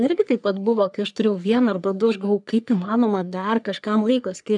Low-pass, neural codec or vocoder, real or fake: 10.8 kHz; codec, 44.1 kHz, 3.4 kbps, Pupu-Codec; fake